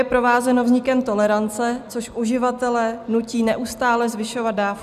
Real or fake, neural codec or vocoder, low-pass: real; none; 14.4 kHz